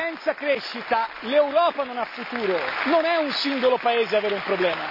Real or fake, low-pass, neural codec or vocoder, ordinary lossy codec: real; 5.4 kHz; none; none